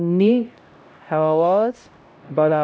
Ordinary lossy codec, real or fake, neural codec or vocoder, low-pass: none; fake; codec, 16 kHz, 0.5 kbps, X-Codec, HuBERT features, trained on LibriSpeech; none